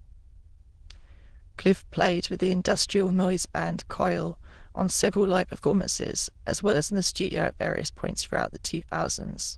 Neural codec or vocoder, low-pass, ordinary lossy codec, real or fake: autoencoder, 22.05 kHz, a latent of 192 numbers a frame, VITS, trained on many speakers; 9.9 kHz; Opus, 16 kbps; fake